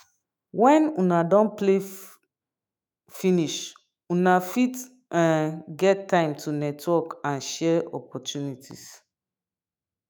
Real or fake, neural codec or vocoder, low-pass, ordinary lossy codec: fake; autoencoder, 48 kHz, 128 numbers a frame, DAC-VAE, trained on Japanese speech; none; none